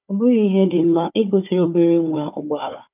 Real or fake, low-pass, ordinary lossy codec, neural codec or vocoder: fake; 3.6 kHz; none; codec, 16 kHz, 4 kbps, FunCodec, trained on Chinese and English, 50 frames a second